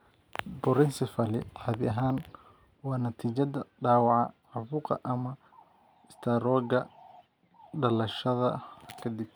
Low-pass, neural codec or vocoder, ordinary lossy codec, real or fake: none; none; none; real